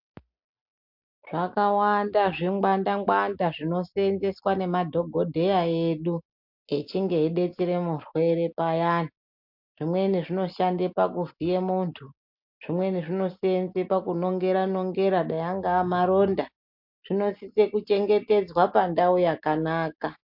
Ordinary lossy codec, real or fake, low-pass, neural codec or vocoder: MP3, 48 kbps; real; 5.4 kHz; none